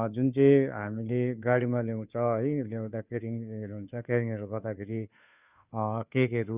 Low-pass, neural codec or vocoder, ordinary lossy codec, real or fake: 3.6 kHz; codec, 16 kHz, 2 kbps, FunCodec, trained on Chinese and English, 25 frames a second; Opus, 64 kbps; fake